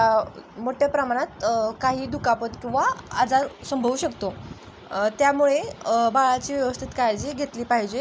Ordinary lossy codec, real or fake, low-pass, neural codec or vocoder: none; real; none; none